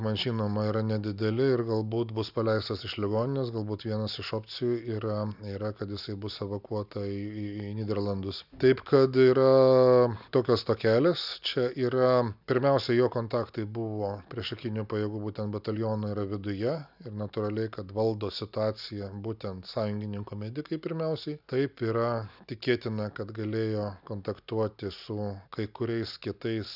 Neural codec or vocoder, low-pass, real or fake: none; 5.4 kHz; real